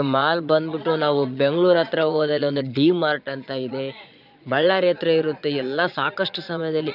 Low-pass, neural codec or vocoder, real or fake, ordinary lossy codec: 5.4 kHz; vocoder, 22.05 kHz, 80 mel bands, Vocos; fake; none